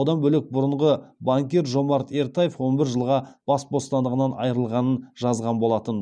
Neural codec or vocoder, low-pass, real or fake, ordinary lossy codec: none; 9.9 kHz; real; none